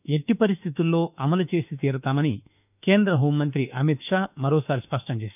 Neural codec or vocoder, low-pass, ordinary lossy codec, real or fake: autoencoder, 48 kHz, 32 numbers a frame, DAC-VAE, trained on Japanese speech; 3.6 kHz; none; fake